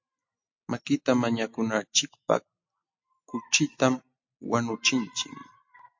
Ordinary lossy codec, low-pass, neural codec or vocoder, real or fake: MP3, 48 kbps; 7.2 kHz; none; real